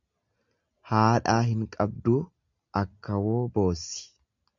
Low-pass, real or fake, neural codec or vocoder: 7.2 kHz; real; none